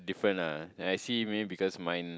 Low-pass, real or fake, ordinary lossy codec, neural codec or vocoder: none; real; none; none